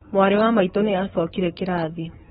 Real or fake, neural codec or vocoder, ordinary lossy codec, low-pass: fake; codec, 16 kHz, 4 kbps, FunCodec, trained on Chinese and English, 50 frames a second; AAC, 16 kbps; 7.2 kHz